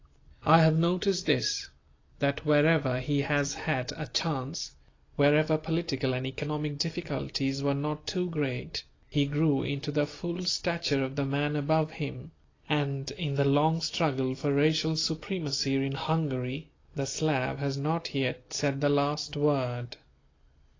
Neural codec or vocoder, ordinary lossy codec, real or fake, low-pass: none; AAC, 32 kbps; real; 7.2 kHz